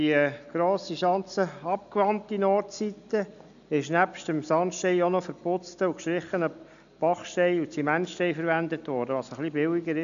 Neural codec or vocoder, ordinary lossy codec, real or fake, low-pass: none; none; real; 7.2 kHz